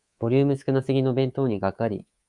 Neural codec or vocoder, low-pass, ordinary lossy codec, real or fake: codec, 24 kHz, 3.1 kbps, DualCodec; 10.8 kHz; Opus, 64 kbps; fake